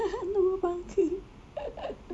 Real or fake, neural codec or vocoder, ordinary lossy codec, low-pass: real; none; none; none